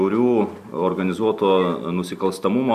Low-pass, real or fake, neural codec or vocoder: 14.4 kHz; real; none